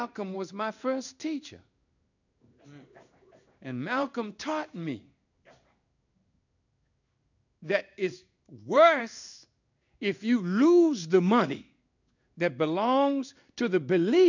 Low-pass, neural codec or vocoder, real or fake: 7.2 kHz; codec, 16 kHz in and 24 kHz out, 1 kbps, XY-Tokenizer; fake